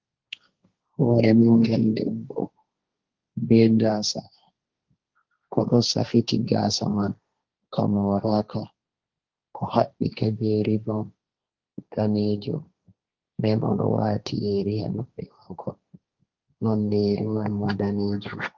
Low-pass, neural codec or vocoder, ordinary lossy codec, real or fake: 7.2 kHz; codec, 16 kHz, 1.1 kbps, Voila-Tokenizer; Opus, 32 kbps; fake